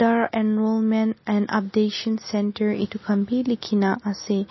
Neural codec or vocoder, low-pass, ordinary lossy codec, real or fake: none; 7.2 kHz; MP3, 24 kbps; real